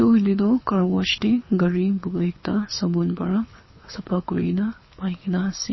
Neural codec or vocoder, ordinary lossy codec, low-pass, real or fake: codec, 24 kHz, 6 kbps, HILCodec; MP3, 24 kbps; 7.2 kHz; fake